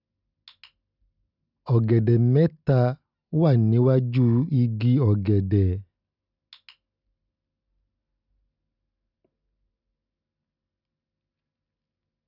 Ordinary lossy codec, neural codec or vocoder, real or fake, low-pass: none; none; real; 5.4 kHz